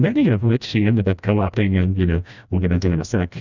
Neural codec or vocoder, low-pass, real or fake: codec, 16 kHz, 1 kbps, FreqCodec, smaller model; 7.2 kHz; fake